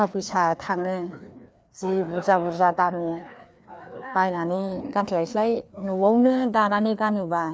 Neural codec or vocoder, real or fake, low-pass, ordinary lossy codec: codec, 16 kHz, 2 kbps, FreqCodec, larger model; fake; none; none